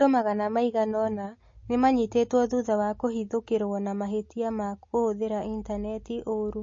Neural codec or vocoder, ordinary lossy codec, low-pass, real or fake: none; MP3, 32 kbps; 7.2 kHz; real